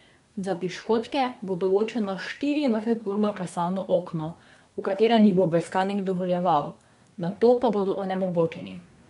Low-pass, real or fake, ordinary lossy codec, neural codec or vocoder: 10.8 kHz; fake; none; codec, 24 kHz, 1 kbps, SNAC